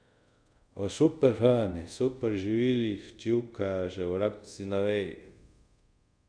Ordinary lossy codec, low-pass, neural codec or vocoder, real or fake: none; 9.9 kHz; codec, 24 kHz, 0.5 kbps, DualCodec; fake